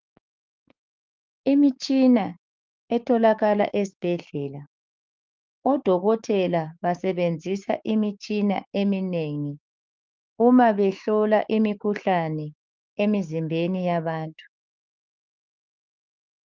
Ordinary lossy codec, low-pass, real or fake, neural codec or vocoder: Opus, 24 kbps; 7.2 kHz; fake; codec, 16 kHz, 6 kbps, DAC